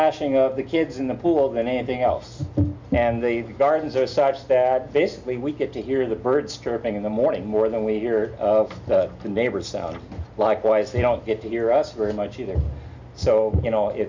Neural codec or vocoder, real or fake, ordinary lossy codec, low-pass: none; real; MP3, 64 kbps; 7.2 kHz